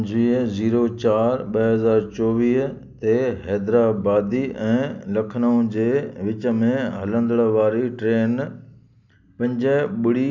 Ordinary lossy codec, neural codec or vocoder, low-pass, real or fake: none; none; 7.2 kHz; real